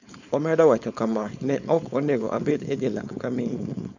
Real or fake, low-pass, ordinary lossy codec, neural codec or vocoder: fake; 7.2 kHz; none; codec, 16 kHz, 4.8 kbps, FACodec